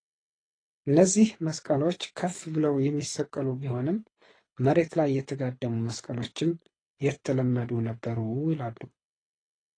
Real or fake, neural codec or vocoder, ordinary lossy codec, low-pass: fake; codec, 24 kHz, 6 kbps, HILCodec; AAC, 32 kbps; 9.9 kHz